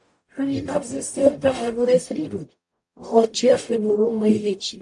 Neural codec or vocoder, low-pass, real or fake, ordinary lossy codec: codec, 44.1 kHz, 0.9 kbps, DAC; 10.8 kHz; fake; AAC, 64 kbps